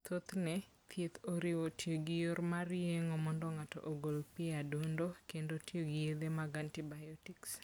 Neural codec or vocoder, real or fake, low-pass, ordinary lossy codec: none; real; none; none